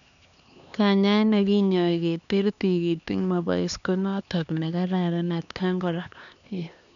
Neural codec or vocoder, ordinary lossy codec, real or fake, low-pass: codec, 16 kHz, 2 kbps, X-Codec, HuBERT features, trained on LibriSpeech; none; fake; 7.2 kHz